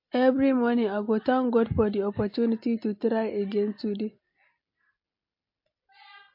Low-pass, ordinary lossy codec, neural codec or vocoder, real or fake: 5.4 kHz; MP3, 32 kbps; none; real